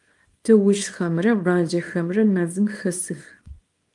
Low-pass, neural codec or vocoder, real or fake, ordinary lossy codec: 10.8 kHz; codec, 24 kHz, 0.9 kbps, WavTokenizer, small release; fake; Opus, 32 kbps